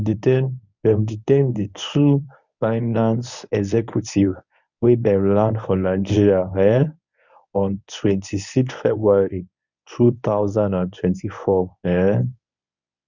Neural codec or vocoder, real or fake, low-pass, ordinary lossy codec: codec, 24 kHz, 0.9 kbps, WavTokenizer, medium speech release version 1; fake; 7.2 kHz; none